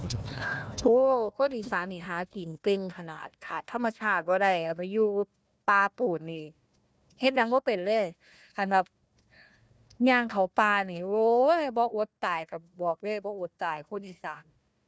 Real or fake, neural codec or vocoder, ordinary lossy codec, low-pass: fake; codec, 16 kHz, 1 kbps, FunCodec, trained on Chinese and English, 50 frames a second; none; none